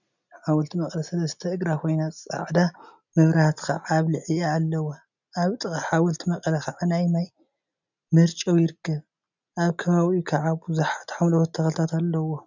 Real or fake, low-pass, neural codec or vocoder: real; 7.2 kHz; none